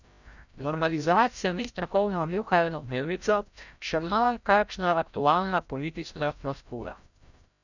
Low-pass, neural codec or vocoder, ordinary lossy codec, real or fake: 7.2 kHz; codec, 16 kHz, 0.5 kbps, FreqCodec, larger model; none; fake